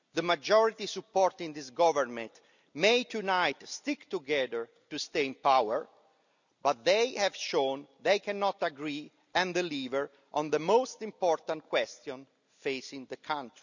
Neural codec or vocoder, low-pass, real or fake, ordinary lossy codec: none; 7.2 kHz; real; none